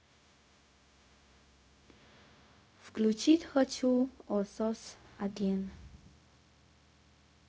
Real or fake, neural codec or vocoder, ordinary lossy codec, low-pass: fake; codec, 16 kHz, 0.4 kbps, LongCat-Audio-Codec; none; none